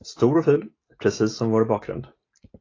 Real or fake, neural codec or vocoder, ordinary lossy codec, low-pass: real; none; AAC, 32 kbps; 7.2 kHz